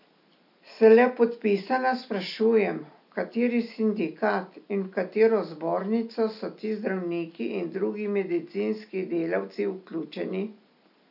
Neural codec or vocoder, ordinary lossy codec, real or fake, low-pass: none; none; real; 5.4 kHz